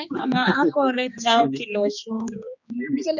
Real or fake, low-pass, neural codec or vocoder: fake; 7.2 kHz; codec, 16 kHz, 2 kbps, X-Codec, HuBERT features, trained on general audio